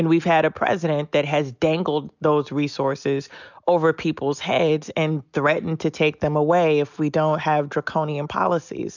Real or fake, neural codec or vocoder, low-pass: real; none; 7.2 kHz